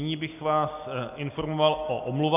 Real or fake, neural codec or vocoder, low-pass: real; none; 3.6 kHz